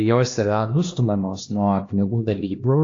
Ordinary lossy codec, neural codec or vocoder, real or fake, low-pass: AAC, 48 kbps; codec, 16 kHz, 1 kbps, X-Codec, HuBERT features, trained on LibriSpeech; fake; 7.2 kHz